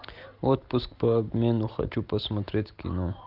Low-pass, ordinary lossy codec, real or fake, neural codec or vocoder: 5.4 kHz; Opus, 32 kbps; real; none